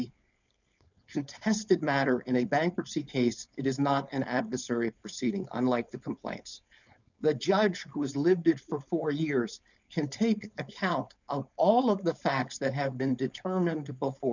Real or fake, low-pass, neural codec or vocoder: fake; 7.2 kHz; codec, 16 kHz, 4.8 kbps, FACodec